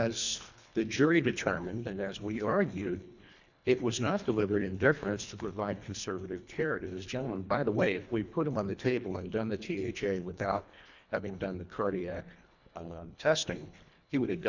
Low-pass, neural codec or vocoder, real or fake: 7.2 kHz; codec, 24 kHz, 1.5 kbps, HILCodec; fake